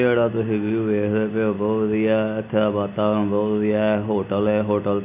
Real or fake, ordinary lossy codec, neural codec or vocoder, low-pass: real; none; none; 3.6 kHz